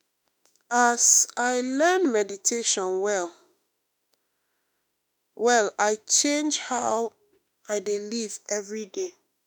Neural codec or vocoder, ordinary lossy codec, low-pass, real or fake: autoencoder, 48 kHz, 32 numbers a frame, DAC-VAE, trained on Japanese speech; none; none; fake